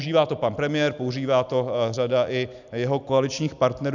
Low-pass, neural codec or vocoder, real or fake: 7.2 kHz; none; real